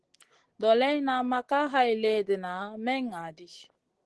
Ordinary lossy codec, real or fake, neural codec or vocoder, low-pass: Opus, 16 kbps; real; none; 10.8 kHz